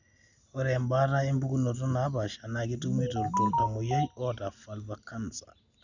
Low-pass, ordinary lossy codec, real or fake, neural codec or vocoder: 7.2 kHz; none; real; none